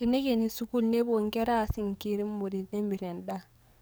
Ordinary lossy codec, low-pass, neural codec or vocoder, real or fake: none; none; codec, 44.1 kHz, 7.8 kbps, DAC; fake